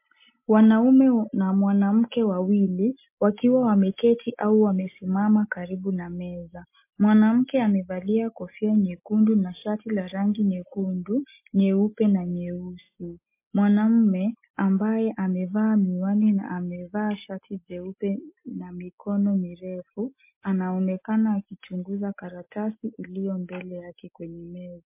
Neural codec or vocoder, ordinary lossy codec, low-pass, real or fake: none; MP3, 24 kbps; 3.6 kHz; real